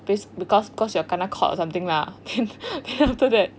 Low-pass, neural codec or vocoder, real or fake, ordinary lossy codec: none; none; real; none